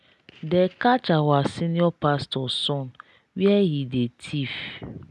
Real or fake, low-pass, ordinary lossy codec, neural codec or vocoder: real; none; none; none